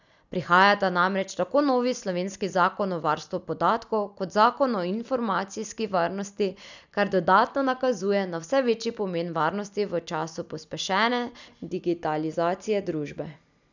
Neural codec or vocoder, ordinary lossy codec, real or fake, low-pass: none; none; real; 7.2 kHz